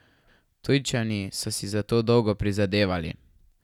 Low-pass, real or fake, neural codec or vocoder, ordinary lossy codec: 19.8 kHz; real; none; none